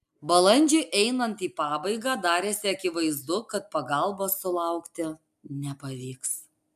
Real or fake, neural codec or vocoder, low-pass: real; none; 14.4 kHz